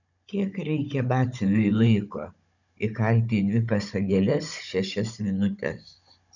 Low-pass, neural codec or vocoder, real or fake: 7.2 kHz; codec, 16 kHz, 16 kbps, FunCodec, trained on Chinese and English, 50 frames a second; fake